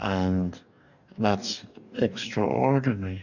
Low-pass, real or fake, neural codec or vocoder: 7.2 kHz; fake; codec, 44.1 kHz, 2.6 kbps, DAC